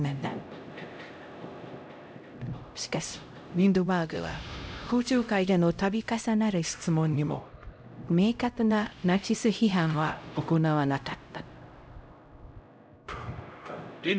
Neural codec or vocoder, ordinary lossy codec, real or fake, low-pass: codec, 16 kHz, 0.5 kbps, X-Codec, HuBERT features, trained on LibriSpeech; none; fake; none